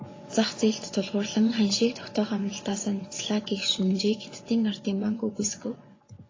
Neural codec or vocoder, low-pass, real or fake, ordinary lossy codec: vocoder, 24 kHz, 100 mel bands, Vocos; 7.2 kHz; fake; AAC, 32 kbps